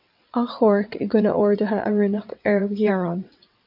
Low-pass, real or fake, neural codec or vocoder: 5.4 kHz; fake; vocoder, 22.05 kHz, 80 mel bands, WaveNeXt